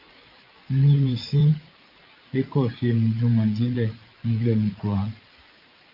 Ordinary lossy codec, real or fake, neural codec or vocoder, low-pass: Opus, 32 kbps; fake; vocoder, 44.1 kHz, 80 mel bands, Vocos; 5.4 kHz